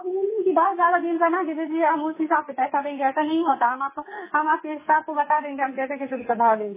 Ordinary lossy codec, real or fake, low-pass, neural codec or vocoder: MP3, 16 kbps; fake; 3.6 kHz; codec, 44.1 kHz, 2.6 kbps, SNAC